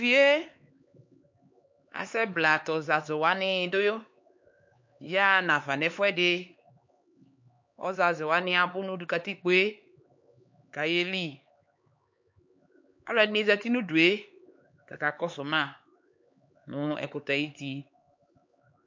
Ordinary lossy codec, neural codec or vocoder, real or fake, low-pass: MP3, 48 kbps; codec, 16 kHz, 4 kbps, X-Codec, HuBERT features, trained on LibriSpeech; fake; 7.2 kHz